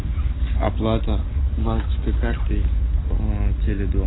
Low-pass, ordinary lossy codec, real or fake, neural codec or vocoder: 7.2 kHz; AAC, 16 kbps; real; none